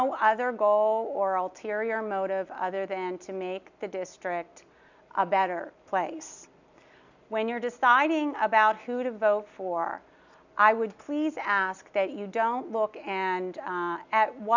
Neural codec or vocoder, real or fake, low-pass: none; real; 7.2 kHz